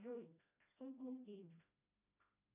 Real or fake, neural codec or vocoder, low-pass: fake; codec, 16 kHz, 1 kbps, FreqCodec, smaller model; 3.6 kHz